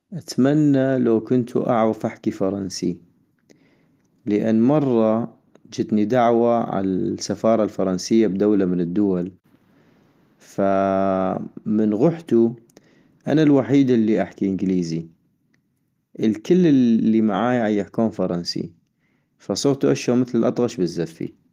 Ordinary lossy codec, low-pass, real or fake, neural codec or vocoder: Opus, 24 kbps; 14.4 kHz; real; none